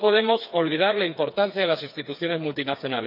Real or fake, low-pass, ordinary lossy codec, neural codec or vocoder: fake; 5.4 kHz; none; codec, 16 kHz, 4 kbps, FreqCodec, smaller model